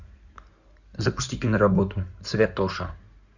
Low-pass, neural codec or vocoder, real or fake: 7.2 kHz; codec, 16 kHz in and 24 kHz out, 2.2 kbps, FireRedTTS-2 codec; fake